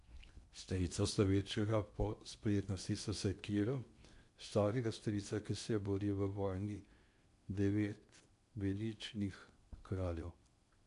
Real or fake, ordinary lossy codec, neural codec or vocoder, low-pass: fake; MP3, 64 kbps; codec, 16 kHz in and 24 kHz out, 0.8 kbps, FocalCodec, streaming, 65536 codes; 10.8 kHz